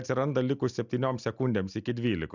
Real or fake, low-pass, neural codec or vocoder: real; 7.2 kHz; none